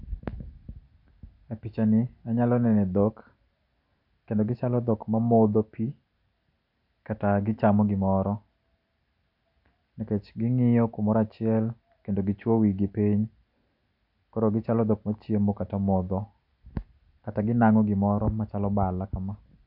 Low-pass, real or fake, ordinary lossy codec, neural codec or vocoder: 5.4 kHz; real; none; none